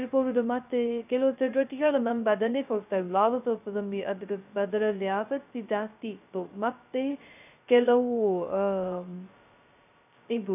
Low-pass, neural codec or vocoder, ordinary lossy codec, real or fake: 3.6 kHz; codec, 16 kHz, 0.2 kbps, FocalCodec; none; fake